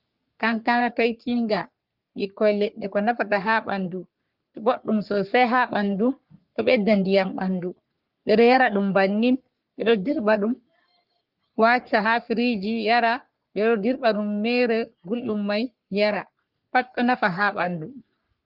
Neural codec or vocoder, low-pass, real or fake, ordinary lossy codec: codec, 44.1 kHz, 3.4 kbps, Pupu-Codec; 5.4 kHz; fake; Opus, 24 kbps